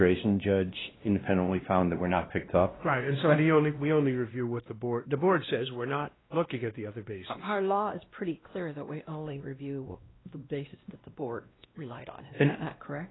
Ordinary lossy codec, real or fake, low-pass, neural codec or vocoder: AAC, 16 kbps; fake; 7.2 kHz; codec, 16 kHz, 1 kbps, X-Codec, WavLM features, trained on Multilingual LibriSpeech